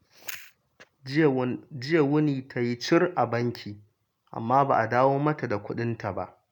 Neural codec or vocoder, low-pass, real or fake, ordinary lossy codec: none; 19.8 kHz; real; none